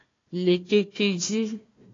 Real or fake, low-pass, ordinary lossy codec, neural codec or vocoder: fake; 7.2 kHz; AAC, 32 kbps; codec, 16 kHz, 1 kbps, FunCodec, trained on Chinese and English, 50 frames a second